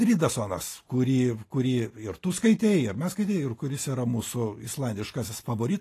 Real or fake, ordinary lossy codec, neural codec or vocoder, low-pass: real; AAC, 48 kbps; none; 14.4 kHz